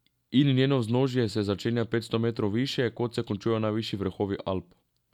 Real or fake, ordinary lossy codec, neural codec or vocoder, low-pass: real; none; none; 19.8 kHz